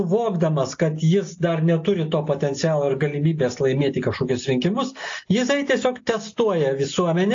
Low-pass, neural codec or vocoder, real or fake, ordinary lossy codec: 7.2 kHz; none; real; AAC, 48 kbps